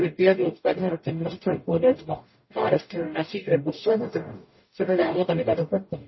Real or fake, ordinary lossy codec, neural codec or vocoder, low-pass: fake; MP3, 24 kbps; codec, 44.1 kHz, 0.9 kbps, DAC; 7.2 kHz